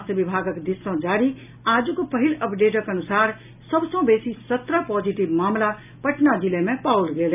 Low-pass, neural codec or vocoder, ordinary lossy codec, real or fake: 3.6 kHz; none; none; real